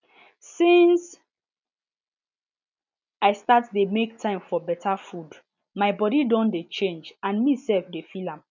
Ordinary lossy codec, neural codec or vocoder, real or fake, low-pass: none; none; real; 7.2 kHz